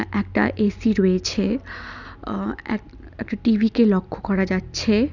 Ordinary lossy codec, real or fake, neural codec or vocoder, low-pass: none; real; none; 7.2 kHz